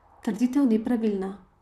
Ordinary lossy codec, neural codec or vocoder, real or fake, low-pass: none; vocoder, 44.1 kHz, 128 mel bands, Pupu-Vocoder; fake; 14.4 kHz